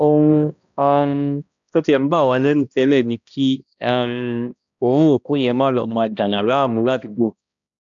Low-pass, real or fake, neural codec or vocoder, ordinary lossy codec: 7.2 kHz; fake; codec, 16 kHz, 1 kbps, X-Codec, HuBERT features, trained on balanced general audio; none